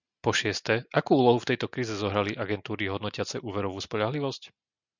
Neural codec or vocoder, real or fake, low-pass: none; real; 7.2 kHz